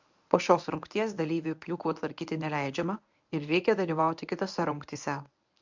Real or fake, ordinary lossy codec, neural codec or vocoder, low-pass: fake; MP3, 64 kbps; codec, 24 kHz, 0.9 kbps, WavTokenizer, medium speech release version 1; 7.2 kHz